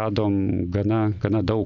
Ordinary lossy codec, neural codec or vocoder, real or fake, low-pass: MP3, 96 kbps; none; real; 7.2 kHz